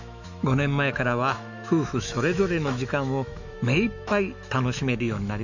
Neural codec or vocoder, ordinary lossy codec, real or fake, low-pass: autoencoder, 48 kHz, 128 numbers a frame, DAC-VAE, trained on Japanese speech; none; fake; 7.2 kHz